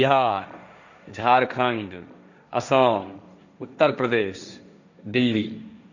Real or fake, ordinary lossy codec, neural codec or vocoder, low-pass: fake; none; codec, 16 kHz, 1.1 kbps, Voila-Tokenizer; 7.2 kHz